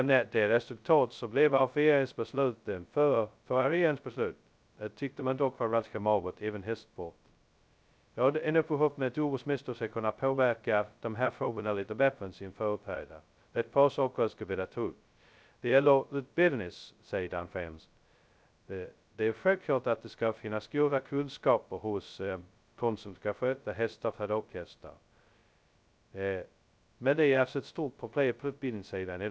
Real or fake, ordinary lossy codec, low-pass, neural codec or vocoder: fake; none; none; codec, 16 kHz, 0.2 kbps, FocalCodec